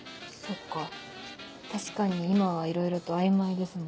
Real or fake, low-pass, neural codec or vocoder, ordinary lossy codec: real; none; none; none